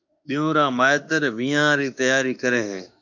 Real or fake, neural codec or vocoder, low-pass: fake; autoencoder, 48 kHz, 32 numbers a frame, DAC-VAE, trained on Japanese speech; 7.2 kHz